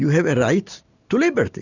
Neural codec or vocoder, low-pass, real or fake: none; 7.2 kHz; real